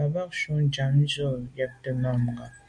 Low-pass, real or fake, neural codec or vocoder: 9.9 kHz; real; none